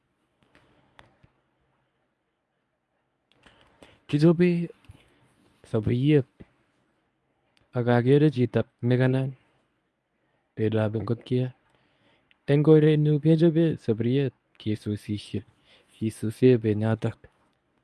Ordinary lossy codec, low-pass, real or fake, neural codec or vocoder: none; none; fake; codec, 24 kHz, 0.9 kbps, WavTokenizer, medium speech release version 1